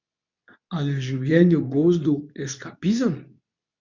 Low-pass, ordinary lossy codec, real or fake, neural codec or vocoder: 7.2 kHz; none; fake; codec, 24 kHz, 0.9 kbps, WavTokenizer, medium speech release version 2